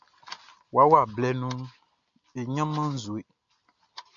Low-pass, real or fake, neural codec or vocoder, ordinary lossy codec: 7.2 kHz; real; none; MP3, 64 kbps